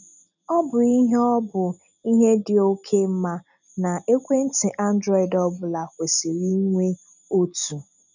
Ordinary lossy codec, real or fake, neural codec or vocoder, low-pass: none; real; none; 7.2 kHz